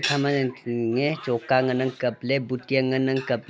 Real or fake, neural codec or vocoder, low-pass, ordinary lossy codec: real; none; none; none